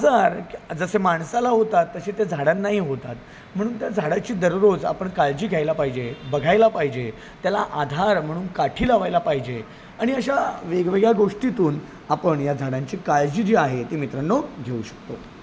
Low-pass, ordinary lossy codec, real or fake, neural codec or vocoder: none; none; real; none